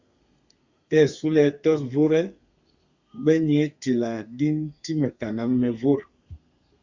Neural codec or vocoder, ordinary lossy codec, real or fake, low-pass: codec, 32 kHz, 1.9 kbps, SNAC; Opus, 64 kbps; fake; 7.2 kHz